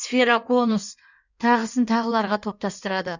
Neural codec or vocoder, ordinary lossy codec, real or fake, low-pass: codec, 16 kHz in and 24 kHz out, 1.1 kbps, FireRedTTS-2 codec; none; fake; 7.2 kHz